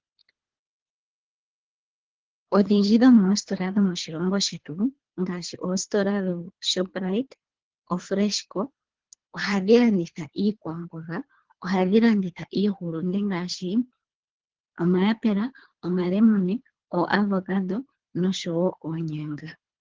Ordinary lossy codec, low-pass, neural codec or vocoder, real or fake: Opus, 16 kbps; 7.2 kHz; codec, 24 kHz, 3 kbps, HILCodec; fake